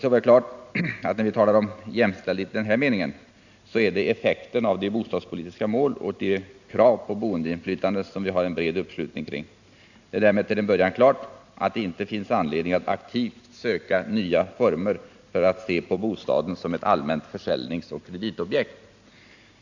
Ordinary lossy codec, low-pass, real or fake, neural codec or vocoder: none; 7.2 kHz; real; none